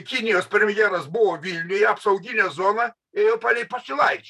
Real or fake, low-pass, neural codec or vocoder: fake; 14.4 kHz; vocoder, 44.1 kHz, 128 mel bands every 512 samples, BigVGAN v2